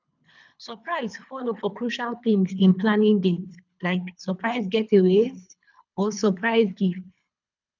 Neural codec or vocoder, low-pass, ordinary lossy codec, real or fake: codec, 24 kHz, 3 kbps, HILCodec; 7.2 kHz; none; fake